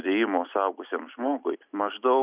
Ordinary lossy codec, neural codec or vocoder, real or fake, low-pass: Opus, 64 kbps; none; real; 3.6 kHz